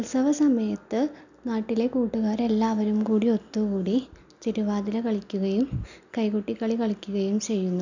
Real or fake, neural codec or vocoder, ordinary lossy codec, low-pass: real; none; none; 7.2 kHz